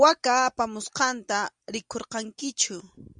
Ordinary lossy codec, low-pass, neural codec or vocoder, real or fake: Opus, 64 kbps; 9.9 kHz; none; real